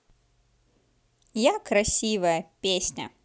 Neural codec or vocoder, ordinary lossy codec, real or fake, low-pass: none; none; real; none